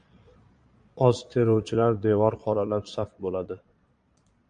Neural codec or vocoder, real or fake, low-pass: vocoder, 22.05 kHz, 80 mel bands, Vocos; fake; 9.9 kHz